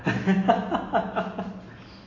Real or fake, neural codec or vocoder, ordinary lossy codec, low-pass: real; none; AAC, 32 kbps; 7.2 kHz